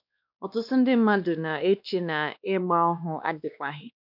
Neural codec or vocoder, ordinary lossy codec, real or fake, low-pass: codec, 16 kHz, 2 kbps, X-Codec, WavLM features, trained on Multilingual LibriSpeech; none; fake; 5.4 kHz